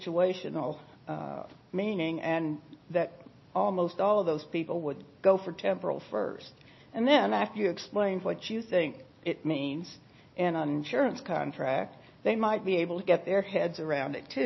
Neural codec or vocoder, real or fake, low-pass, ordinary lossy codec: none; real; 7.2 kHz; MP3, 24 kbps